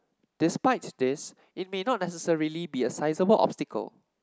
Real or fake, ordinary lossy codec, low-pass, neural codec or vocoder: real; none; none; none